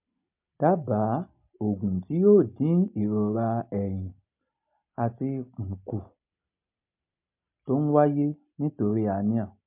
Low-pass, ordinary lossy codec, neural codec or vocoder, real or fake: 3.6 kHz; MP3, 32 kbps; none; real